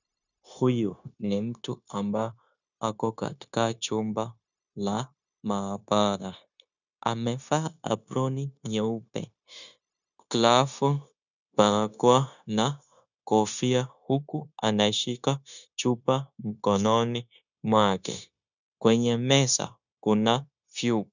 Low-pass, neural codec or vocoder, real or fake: 7.2 kHz; codec, 16 kHz, 0.9 kbps, LongCat-Audio-Codec; fake